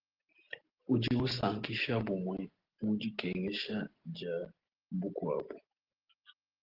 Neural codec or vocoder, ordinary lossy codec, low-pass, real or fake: none; Opus, 16 kbps; 5.4 kHz; real